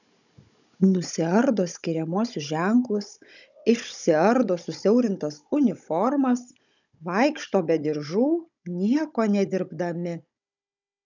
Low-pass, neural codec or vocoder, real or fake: 7.2 kHz; codec, 16 kHz, 16 kbps, FunCodec, trained on Chinese and English, 50 frames a second; fake